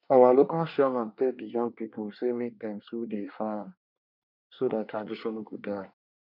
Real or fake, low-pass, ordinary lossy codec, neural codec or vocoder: fake; 5.4 kHz; none; codec, 24 kHz, 1 kbps, SNAC